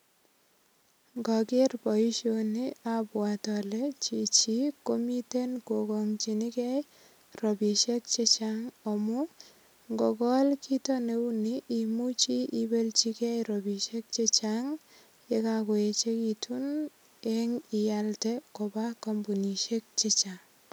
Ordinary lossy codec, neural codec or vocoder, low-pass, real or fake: none; none; none; real